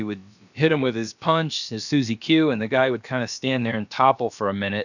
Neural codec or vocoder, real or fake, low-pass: codec, 16 kHz, about 1 kbps, DyCAST, with the encoder's durations; fake; 7.2 kHz